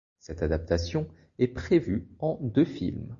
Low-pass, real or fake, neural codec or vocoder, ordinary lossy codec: 7.2 kHz; real; none; Opus, 64 kbps